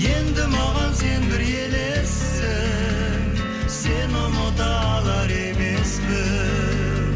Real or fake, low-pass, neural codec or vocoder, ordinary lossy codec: real; none; none; none